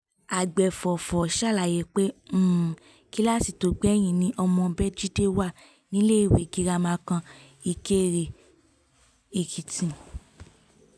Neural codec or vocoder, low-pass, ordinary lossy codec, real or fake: none; none; none; real